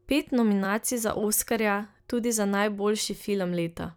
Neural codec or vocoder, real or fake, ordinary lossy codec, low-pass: vocoder, 44.1 kHz, 128 mel bands every 256 samples, BigVGAN v2; fake; none; none